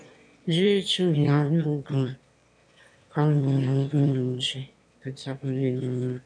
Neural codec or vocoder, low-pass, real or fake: autoencoder, 22.05 kHz, a latent of 192 numbers a frame, VITS, trained on one speaker; 9.9 kHz; fake